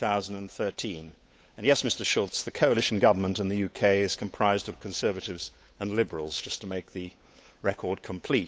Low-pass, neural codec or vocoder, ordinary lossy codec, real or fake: none; codec, 16 kHz, 8 kbps, FunCodec, trained on Chinese and English, 25 frames a second; none; fake